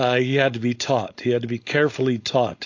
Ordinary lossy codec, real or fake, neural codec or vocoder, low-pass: AAC, 48 kbps; fake; codec, 16 kHz, 4.8 kbps, FACodec; 7.2 kHz